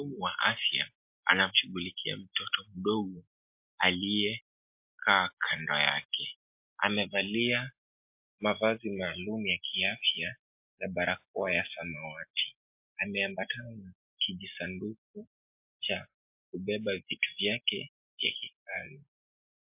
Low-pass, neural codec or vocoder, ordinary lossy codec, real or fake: 3.6 kHz; none; MP3, 32 kbps; real